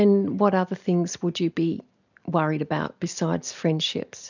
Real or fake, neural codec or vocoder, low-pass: real; none; 7.2 kHz